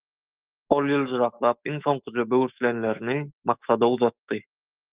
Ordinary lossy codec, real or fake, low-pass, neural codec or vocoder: Opus, 32 kbps; fake; 3.6 kHz; autoencoder, 48 kHz, 128 numbers a frame, DAC-VAE, trained on Japanese speech